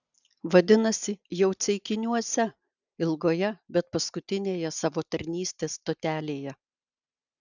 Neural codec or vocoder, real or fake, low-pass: none; real; 7.2 kHz